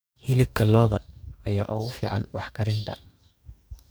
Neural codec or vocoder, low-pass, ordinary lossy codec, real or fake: codec, 44.1 kHz, 2.6 kbps, DAC; none; none; fake